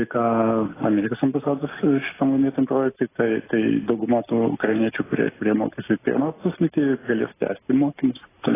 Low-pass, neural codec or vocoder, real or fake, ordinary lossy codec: 3.6 kHz; none; real; AAC, 16 kbps